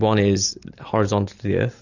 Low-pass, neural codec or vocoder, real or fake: 7.2 kHz; none; real